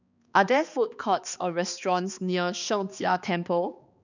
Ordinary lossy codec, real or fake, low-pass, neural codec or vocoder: none; fake; 7.2 kHz; codec, 16 kHz, 2 kbps, X-Codec, HuBERT features, trained on balanced general audio